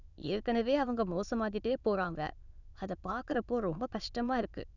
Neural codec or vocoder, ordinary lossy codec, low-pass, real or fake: autoencoder, 22.05 kHz, a latent of 192 numbers a frame, VITS, trained on many speakers; none; 7.2 kHz; fake